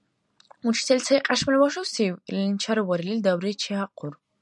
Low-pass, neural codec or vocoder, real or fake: 10.8 kHz; none; real